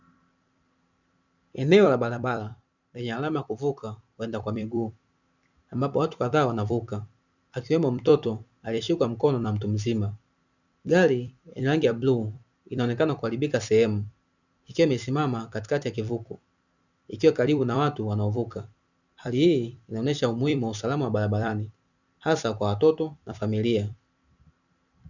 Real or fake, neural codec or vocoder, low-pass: fake; vocoder, 44.1 kHz, 128 mel bands every 256 samples, BigVGAN v2; 7.2 kHz